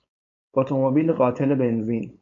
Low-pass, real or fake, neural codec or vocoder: 7.2 kHz; fake; codec, 16 kHz, 4.8 kbps, FACodec